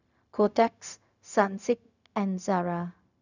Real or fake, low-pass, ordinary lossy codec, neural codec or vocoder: fake; 7.2 kHz; none; codec, 16 kHz, 0.4 kbps, LongCat-Audio-Codec